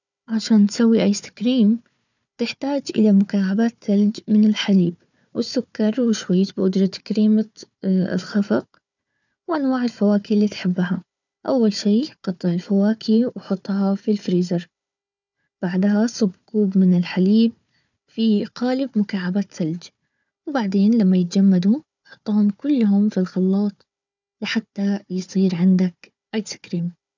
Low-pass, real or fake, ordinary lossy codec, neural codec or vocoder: 7.2 kHz; fake; none; codec, 16 kHz, 4 kbps, FunCodec, trained on Chinese and English, 50 frames a second